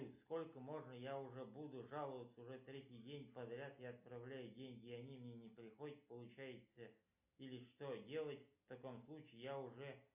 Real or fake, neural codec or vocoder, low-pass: real; none; 3.6 kHz